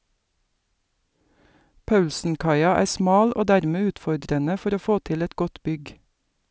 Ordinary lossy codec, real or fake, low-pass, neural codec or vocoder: none; real; none; none